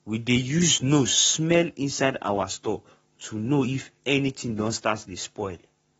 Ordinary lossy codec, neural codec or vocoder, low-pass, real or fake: AAC, 24 kbps; codec, 44.1 kHz, 7.8 kbps, Pupu-Codec; 19.8 kHz; fake